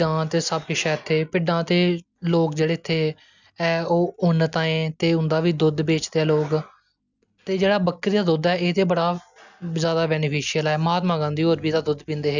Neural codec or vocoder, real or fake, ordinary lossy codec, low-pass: none; real; none; 7.2 kHz